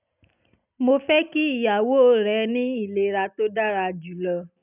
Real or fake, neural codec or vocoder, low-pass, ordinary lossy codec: real; none; 3.6 kHz; none